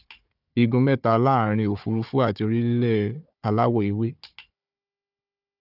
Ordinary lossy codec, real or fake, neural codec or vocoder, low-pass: none; fake; codec, 16 kHz, 4 kbps, FunCodec, trained on Chinese and English, 50 frames a second; 5.4 kHz